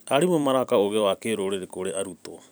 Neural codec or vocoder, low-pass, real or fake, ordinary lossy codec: vocoder, 44.1 kHz, 128 mel bands every 256 samples, BigVGAN v2; none; fake; none